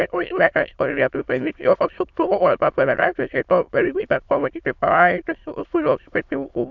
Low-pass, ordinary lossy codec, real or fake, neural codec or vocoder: 7.2 kHz; MP3, 64 kbps; fake; autoencoder, 22.05 kHz, a latent of 192 numbers a frame, VITS, trained on many speakers